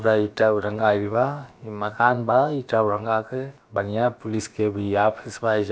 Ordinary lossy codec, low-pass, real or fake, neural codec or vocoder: none; none; fake; codec, 16 kHz, about 1 kbps, DyCAST, with the encoder's durations